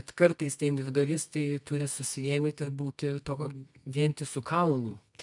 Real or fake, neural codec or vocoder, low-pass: fake; codec, 24 kHz, 0.9 kbps, WavTokenizer, medium music audio release; 10.8 kHz